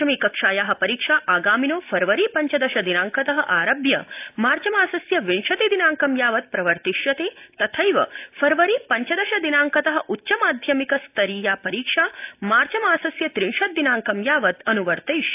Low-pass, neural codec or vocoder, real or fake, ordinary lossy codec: 3.6 kHz; none; real; AAC, 32 kbps